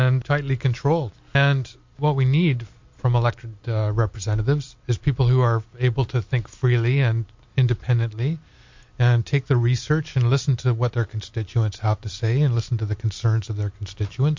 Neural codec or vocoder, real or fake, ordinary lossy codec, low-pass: none; real; MP3, 48 kbps; 7.2 kHz